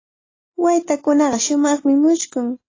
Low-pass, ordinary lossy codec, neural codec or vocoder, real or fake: 7.2 kHz; AAC, 32 kbps; none; real